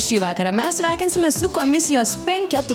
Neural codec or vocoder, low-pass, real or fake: codec, 44.1 kHz, 2.6 kbps, DAC; 19.8 kHz; fake